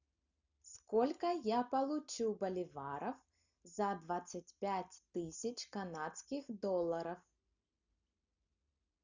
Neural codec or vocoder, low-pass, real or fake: none; 7.2 kHz; real